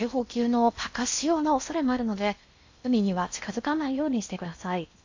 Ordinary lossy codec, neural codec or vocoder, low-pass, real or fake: AAC, 48 kbps; codec, 16 kHz in and 24 kHz out, 0.6 kbps, FocalCodec, streaming, 4096 codes; 7.2 kHz; fake